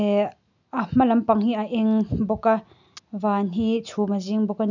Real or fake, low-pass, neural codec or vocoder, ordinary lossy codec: real; 7.2 kHz; none; none